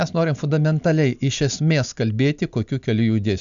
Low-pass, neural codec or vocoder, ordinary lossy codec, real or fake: 7.2 kHz; none; MP3, 96 kbps; real